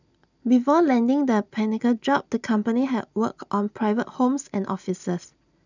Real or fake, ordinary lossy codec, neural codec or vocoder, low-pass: real; none; none; 7.2 kHz